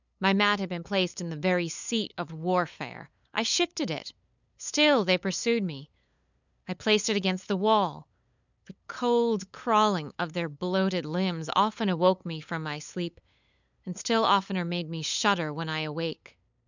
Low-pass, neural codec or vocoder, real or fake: 7.2 kHz; codec, 16 kHz, 8 kbps, FunCodec, trained on LibriTTS, 25 frames a second; fake